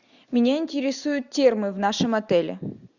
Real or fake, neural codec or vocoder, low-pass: real; none; 7.2 kHz